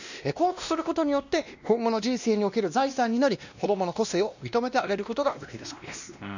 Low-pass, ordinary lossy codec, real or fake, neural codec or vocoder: 7.2 kHz; none; fake; codec, 16 kHz, 1 kbps, X-Codec, WavLM features, trained on Multilingual LibriSpeech